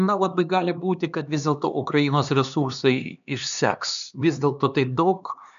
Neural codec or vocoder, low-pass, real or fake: codec, 16 kHz, 2 kbps, X-Codec, HuBERT features, trained on LibriSpeech; 7.2 kHz; fake